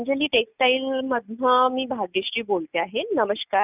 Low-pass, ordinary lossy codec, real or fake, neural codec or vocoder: 3.6 kHz; none; real; none